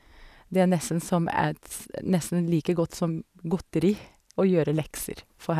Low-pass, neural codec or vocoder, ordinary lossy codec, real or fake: 14.4 kHz; none; none; real